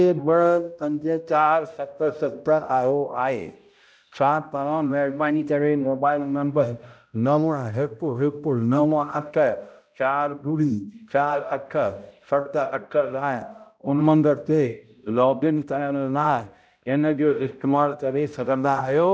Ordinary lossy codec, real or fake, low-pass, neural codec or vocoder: none; fake; none; codec, 16 kHz, 0.5 kbps, X-Codec, HuBERT features, trained on balanced general audio